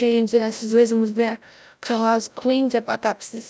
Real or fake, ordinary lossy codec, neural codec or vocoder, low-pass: fake; none; codec, 16 kHz, 0.5 kbps, FreqCodec, larger model; none